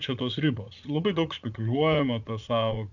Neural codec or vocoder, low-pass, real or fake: vocoder, 44.1 kHz, 80 mel bands, Vocos; 7.2 kHz; fake